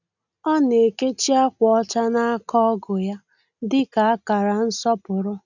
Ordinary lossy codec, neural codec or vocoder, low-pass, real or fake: none; none; 7.2 kHz; real